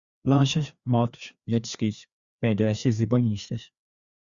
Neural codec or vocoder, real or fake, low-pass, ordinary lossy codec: codec, 16 kHz, 2 kbps, FreqCodec, larger model; fake; 7.2 kHz; Opus, 64 kbps